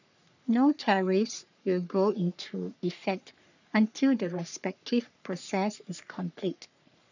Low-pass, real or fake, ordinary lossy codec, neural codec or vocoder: 7.2 kHz; fake; none; codec, 44.1 kHz, 3.4 kbps, Pupu-Codec